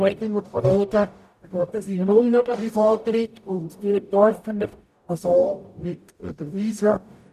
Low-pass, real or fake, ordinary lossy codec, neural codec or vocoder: 14.4 kHz; fake; none; codec, 44.1 kHz, 0.9 kbps, DAC